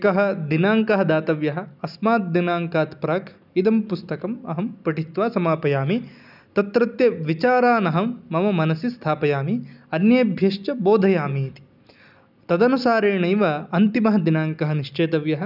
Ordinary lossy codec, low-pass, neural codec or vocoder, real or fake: none; 5.4 kHz; none; real